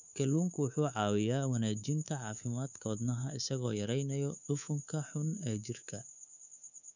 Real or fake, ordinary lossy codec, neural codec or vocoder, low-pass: fake; none; codec, 24 kHz, 3.1 kbps, DualCodec; 7.2 kHz